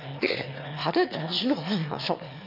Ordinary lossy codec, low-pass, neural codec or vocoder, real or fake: none; 5.4 kHz; autoencoder, 22.05 kHz, a latent of 192 numbers a frame, VITS, trained on one speaker; fake